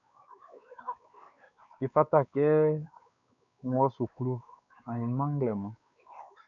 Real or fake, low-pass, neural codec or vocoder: fake; 7.2 kHz; codec, 16 kHz, 4 kbps, X-Codec, WavLM features, trained on Multilingual LibriSpeech